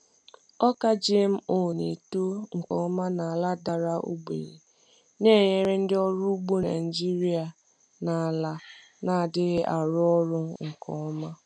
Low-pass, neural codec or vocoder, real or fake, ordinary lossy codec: 9.9 kHz; none; real; none